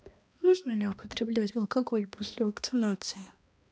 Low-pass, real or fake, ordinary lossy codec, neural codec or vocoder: none; fake; none; codec, 16 kHz, 1 kbps, X-Codec, HuBERT features, trained on balanced general audio